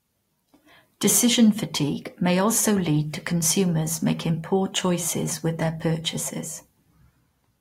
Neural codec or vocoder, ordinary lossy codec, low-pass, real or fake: vocoder, 44.1 kHz, 128 mel bands every 256 samples, BigVGAN v2; AAC, 48 kbps; 19.8 kHz; fake